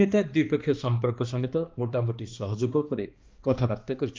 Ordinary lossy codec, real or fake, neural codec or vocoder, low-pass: Opus, 32 kbps; fake; codec, 16 kHz, 2 kbps, X-Codec, HuBERT features, trained on balanced general audio; 7.2 kHz